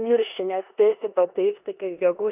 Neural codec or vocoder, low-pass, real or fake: codec, 16 kHz in and 24 kHz out, 0.9 kbps, LongCat-Audio-Codec, four codebook decoder; 3.6 kHz; fake